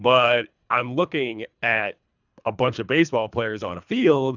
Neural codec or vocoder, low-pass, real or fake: codec, 24 kHz, 3 kbps, HILCodec; 7.2 kHz; fake